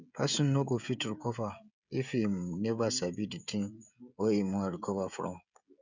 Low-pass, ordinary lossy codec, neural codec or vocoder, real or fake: 7.2 kHz; none; codec, 16 kHz, 16 kbps, FreqCodec, smaller model; fake